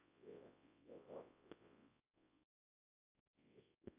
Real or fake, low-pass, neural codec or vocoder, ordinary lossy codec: fake; 3.6 kHz; codec, 24 kHz, 0.9 kbps, WavTokenizer, large speech release; AAC, 32 kbps